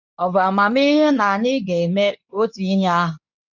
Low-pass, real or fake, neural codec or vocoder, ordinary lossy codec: 7.2 kHz; fake; codec, 24 kHz, 0.9 kbps, WavTokenizer, medium speech release version 2; none